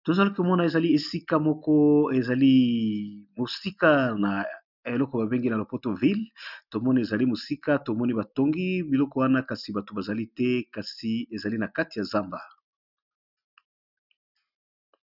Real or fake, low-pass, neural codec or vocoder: real; 5.4 kHz; none